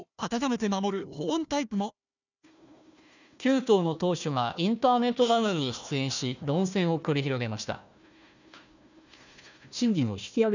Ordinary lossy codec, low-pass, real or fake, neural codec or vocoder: none; 7.2 kHz; fake; codec, 16 kHz, 1 kbps, FunCodec, trained on Chinese and English, 50 frames a second